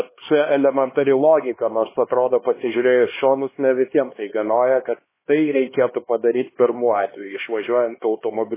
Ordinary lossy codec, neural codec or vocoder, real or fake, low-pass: MP3, 16 kbps; codec, 16 kHz, 2 kbps, X-Codec, HuBERT features, trained on LibriSpeech; fake; 3.6 kHz